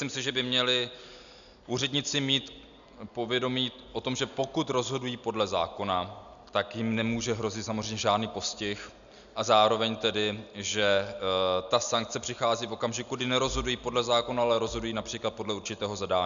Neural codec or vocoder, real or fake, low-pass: none; real; 7.2 kHz